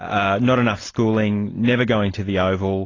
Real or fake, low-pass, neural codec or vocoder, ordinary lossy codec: real; 7.2 kHz; none; AAC, 32 kbps